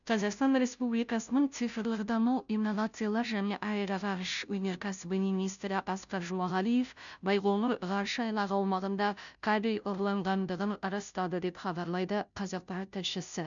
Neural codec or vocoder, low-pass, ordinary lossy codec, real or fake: codec, 16 kHz, 0.5 kbps, FunCodec, trained on Chinese and English, 25 frames a second; 7.2 kHz; none; fake